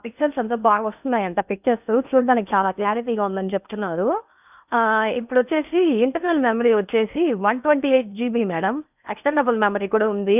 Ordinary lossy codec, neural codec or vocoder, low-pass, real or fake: none; codec, 16 kHz in and 24 kHz out, 0.8 kbps, FocalCodec, streaming, 65536 codes; 3.6 kHz; fake